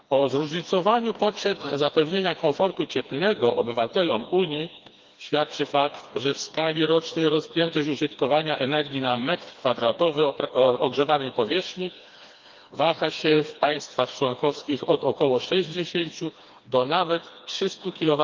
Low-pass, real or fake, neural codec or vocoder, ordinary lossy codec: 7.2 kHz; fake; codec, 16 kHz, 2 kbps, FreqCodec, smaller model; Opus, 24 kbps